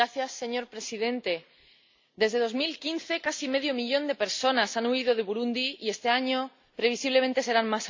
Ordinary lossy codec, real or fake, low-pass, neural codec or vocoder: MP3, 48 kbps; real; 7.2 kHz; none